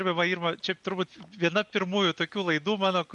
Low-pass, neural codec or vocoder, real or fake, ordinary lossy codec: 10.8 kHz; none; real; MP3, 96 kbps